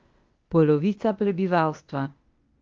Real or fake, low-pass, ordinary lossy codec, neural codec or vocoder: fake; 7.2 kHz; Opus, 24 kbps; codec, 16 kHz, 0.8 kbps, ZipCodec